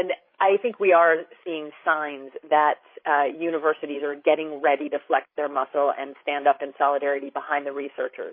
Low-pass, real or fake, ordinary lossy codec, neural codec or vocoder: 5.4 kHz; fake; MP3, 24 kbps; codec, 16 kHz, 8 kbps, FreqCodec, larger model